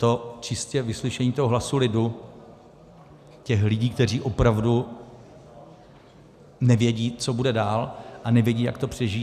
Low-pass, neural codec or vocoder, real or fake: 14.4 kHz; none; real